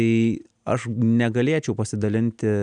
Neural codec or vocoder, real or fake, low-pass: none; real; 9.9 kHz